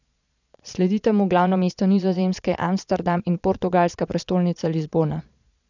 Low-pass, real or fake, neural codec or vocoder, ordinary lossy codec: 7.2 kHz; fake; vocoder, 22.05 kHz, 80 mel bands, Vocos; none